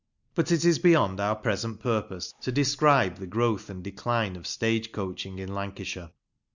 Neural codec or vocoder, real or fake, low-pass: none; real; 7.2 kHz